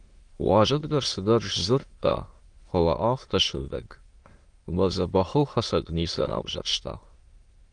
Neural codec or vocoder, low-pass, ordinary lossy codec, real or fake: autoencoder, 22.05 kHz, a latent of 192 numbers a frame, VITS, trained on many speakers; 9.9 kHz; Opus, 24 kbps; fake